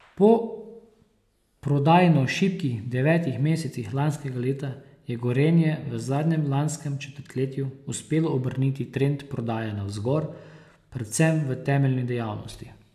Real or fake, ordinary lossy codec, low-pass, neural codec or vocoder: real; AAC, 96 kbps; 14.4 kHz; none